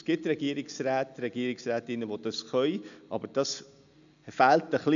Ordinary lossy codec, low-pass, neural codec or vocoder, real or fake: none; 7.2 kHz; none; real